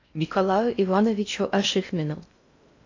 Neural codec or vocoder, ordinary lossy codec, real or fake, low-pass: codec, 16 kHz in and 24 kHz out, 0.6 kbps, FocalCodec, streaming, 4096 codes; AAC, 48 kbps; fake; 7.2 kHz